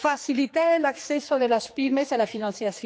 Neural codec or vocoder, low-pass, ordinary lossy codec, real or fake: codec, 16 kHz, 2 kbps, X-Codec, HuBERT features, trained on general audio; none; none; fake